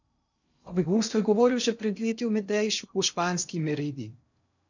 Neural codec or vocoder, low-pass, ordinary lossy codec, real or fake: codec, 16 kHz in and 24 kHz out, 0.6 kbps, FocalCodec, streaming, 2048 codes; 7.2 kHz; none; fake